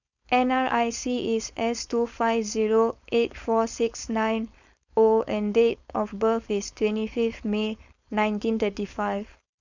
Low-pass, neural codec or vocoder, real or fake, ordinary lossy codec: 7.2 kHz; codec, 16 kHz, 4.8 kbps, FACodec; fake; none